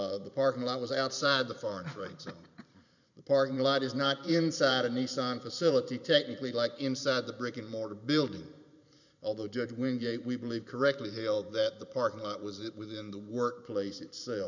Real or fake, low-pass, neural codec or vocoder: fake; 7.2 kHz; vocoder, 44.1 kHz, 128 mel bands every 512 samples, BigVGAN v2